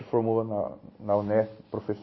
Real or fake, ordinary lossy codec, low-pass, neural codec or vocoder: real; MP3, 24 kbps; 7.2 kHz; none